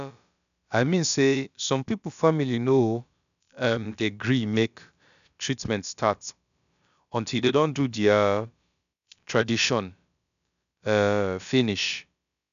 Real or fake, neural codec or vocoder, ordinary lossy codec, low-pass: fake; codec, 16 kHz, about 1 kbps, DyCAST, with the encoder's durations; none; 7.2 kHz